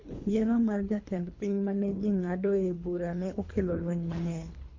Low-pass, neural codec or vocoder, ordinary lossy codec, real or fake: 7.2 kHz; codec, 24 kHz, 3 kbps, HILCodec; MP3, 48 kbps; fake